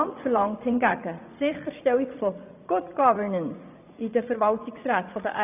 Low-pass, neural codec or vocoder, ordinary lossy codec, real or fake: 3.6 kHz; vocoder, 44.1 kHz, 80 mel bands, Vocos; none; fake